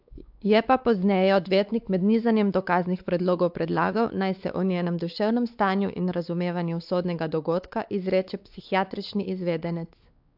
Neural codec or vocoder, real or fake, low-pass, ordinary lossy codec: codec, 16 kHz, 4 kbps, X-Codec, WavLM features, trained on Multilingual LibriSpeech; fake; 5.4 kHz; AAC, 48 kbps